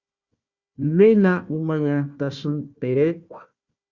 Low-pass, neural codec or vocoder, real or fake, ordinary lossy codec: 7.2 kHz; codec, 16 kHz, 1 kbps, FunCodec, trained on Chinese and English, 50 frames a second; fake; Opus, 64 kbps